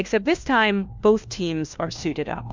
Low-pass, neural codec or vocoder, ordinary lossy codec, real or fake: 7.2 kHz; codec, 16 kHz, 1 kbps, FunCodec, trained on LibriTTS, 50 frames a second; MP3, 64 kbps; fake